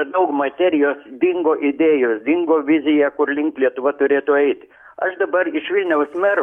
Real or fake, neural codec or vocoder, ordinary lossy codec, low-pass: fake; codec, 44.1 kHz, 7.8 kbps, DAC; MP3, 64 kbps; 19.8 kHz